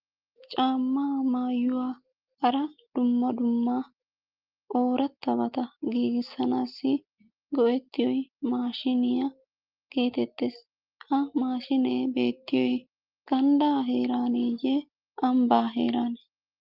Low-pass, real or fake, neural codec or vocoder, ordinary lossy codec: 5.4 kHz; real; none; Opus, 24 kbps